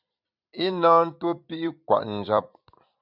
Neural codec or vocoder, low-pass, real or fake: vocoder, 44.1 kHz, 128 mel bands every 256 samples, BigVGAN v2; 5.4 kHz; fake